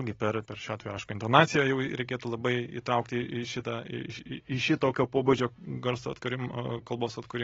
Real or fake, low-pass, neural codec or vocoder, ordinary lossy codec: real; 7.2 kHz; none; AAC, 24 kbps